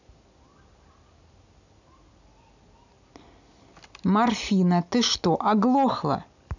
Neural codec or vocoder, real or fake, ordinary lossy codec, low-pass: none; real; none; 7.2 kHz